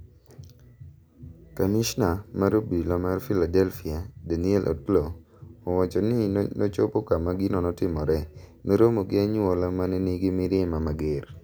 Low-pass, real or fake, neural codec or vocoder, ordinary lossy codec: none; real; none; none